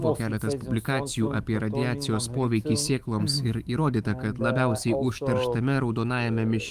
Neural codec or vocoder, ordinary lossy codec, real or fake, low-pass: autoencoder, 48 kHz, 128 numbers a frame, DAC-VAE, trained on Japanese speech; Opus, 32 kbps; fake; 14.4 kHz